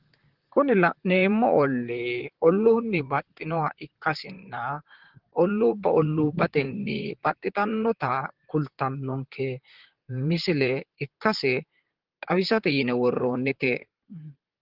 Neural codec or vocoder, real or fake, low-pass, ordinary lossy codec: vocoder, 44.1 kHz, 128 mel bands, Pupu-Vocoder; fake; 5.4 kHz; Opus, 16 kbps